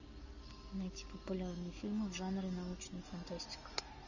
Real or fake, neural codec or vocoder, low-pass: real; none; 7.2 kHz